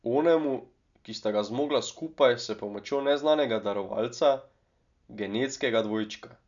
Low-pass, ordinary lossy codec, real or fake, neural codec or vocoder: 7.2 kHz; none; real; none